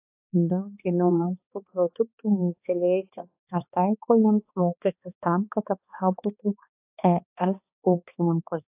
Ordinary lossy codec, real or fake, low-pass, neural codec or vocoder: AAC, 32 kbps; fake; 3.6 kHz; codec, 16 kHz, 2 kbps, X-Codec, HuBERT features, trained on balanced general audio